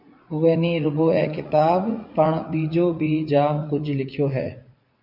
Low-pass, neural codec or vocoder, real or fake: 5.4 kHz; vocoder, 44.1 kHz, 80 mel bands, Vocos; fake